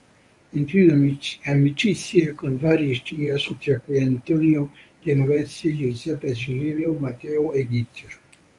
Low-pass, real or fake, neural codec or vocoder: 10.8 kHz; fake; codec, 24 kHz, 0.9 kbps, WavTokenizer, medium speech release version 1